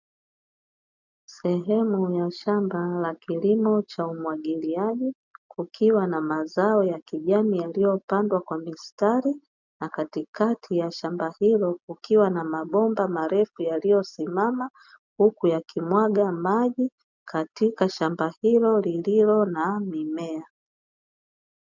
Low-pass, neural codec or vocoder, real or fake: 7.2 kHz; none; real